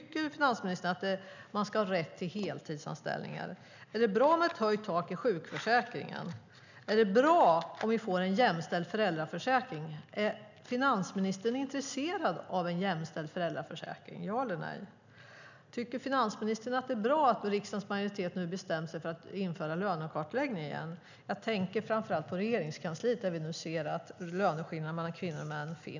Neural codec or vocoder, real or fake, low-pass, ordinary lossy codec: none; real; 7.2 kHz; none